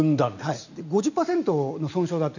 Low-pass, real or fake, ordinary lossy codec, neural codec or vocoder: 7.2 kHz; real; none; none